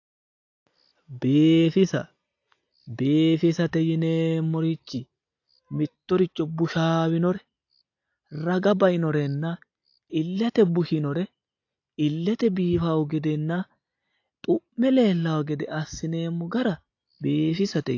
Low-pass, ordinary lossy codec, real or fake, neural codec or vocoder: 7.2 kHz; AAC, 48 kbps; real; none